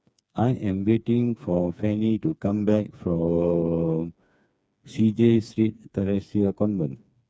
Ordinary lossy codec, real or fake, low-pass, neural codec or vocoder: none; fake; none; codec, 16 kHz, 4 kbps, FreqCodec, smaller model